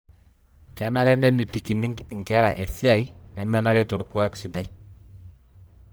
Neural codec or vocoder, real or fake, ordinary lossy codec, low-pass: codec, 44.1 kHz, 1.7 kbps, Pupu-Codec; fake; none; none